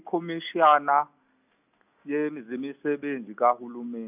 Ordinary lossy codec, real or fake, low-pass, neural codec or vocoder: none; real; 3.6 kHz; none